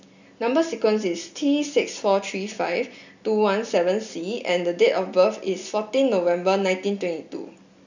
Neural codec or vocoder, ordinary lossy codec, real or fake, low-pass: none; none; real; 7.2 kHz